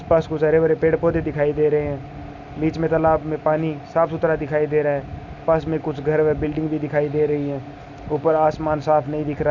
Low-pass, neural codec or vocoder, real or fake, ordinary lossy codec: 7.2 kHz; none; real; none